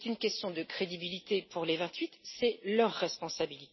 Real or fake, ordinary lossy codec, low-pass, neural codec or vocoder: real; MP3, 24 kbps; 7.2 kHz; none